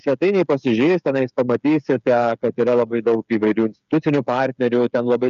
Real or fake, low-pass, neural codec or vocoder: fake; 7.2 kHz; codec, 16 kHz, 8 kbps, FreqCodec, smaller model